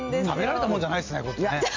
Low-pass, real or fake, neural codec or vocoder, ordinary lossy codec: 7.2 kHz; real; none; MP3, 64 kbps